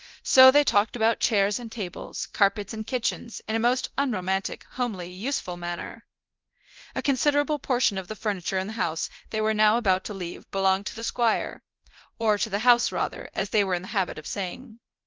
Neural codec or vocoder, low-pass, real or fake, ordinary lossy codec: codec, 24 kHz, 0.9 kbps, DualCodec; 7.2 kHz; fake; Opus, 32 kbps